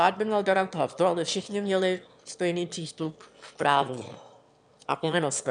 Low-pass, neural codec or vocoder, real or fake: 9.9 kHz; autoencoder, 22.05 kHz, a latent of 192 numbers a frame, VITS, trained on one speaker; fake